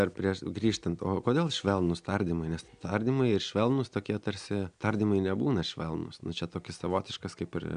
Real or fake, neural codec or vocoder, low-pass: real; none; 9.9 kHz